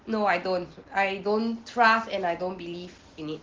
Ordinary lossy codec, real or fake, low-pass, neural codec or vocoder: Opus, 16 kbps; real; 7.2 kHz; none